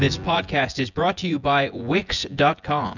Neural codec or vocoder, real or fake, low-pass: vocoder, 24 kHz, 100 mel bands, Vocos; fake; 7.2 kHz